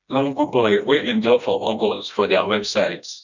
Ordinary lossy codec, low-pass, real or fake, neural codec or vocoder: none; 7.2 kHz; fake; codec, 16 kHz, 1 kbps, FreqCodec, smaller model